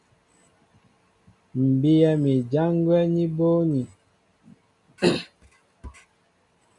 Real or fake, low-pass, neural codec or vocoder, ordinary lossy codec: real; 10.8 kHz; none; MP3, 96 kbps